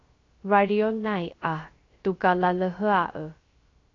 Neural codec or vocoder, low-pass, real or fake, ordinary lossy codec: codec, 16 kHz, 0.3 kbps, FocalCodec; 7.2 kHz; fake; AAC, 32 kbps